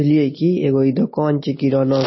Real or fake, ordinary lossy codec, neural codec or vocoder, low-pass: real; MP3, 24 kbps; none; 7.2 kHz